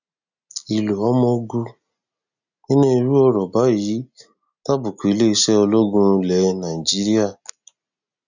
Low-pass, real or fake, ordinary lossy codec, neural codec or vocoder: 7.2 kHz; real; none; none